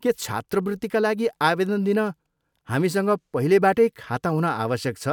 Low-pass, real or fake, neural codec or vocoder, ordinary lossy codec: 19.8 kHz; real; none; none